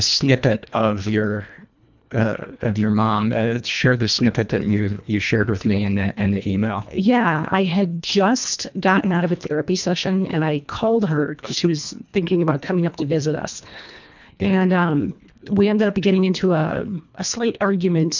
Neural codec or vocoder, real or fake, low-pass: codec, 24 kHz, 1.5 kbps, HILCodec; fake; 7.2 kHz